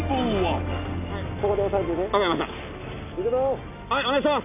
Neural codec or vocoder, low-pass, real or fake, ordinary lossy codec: none; 3.6 kHz; real; none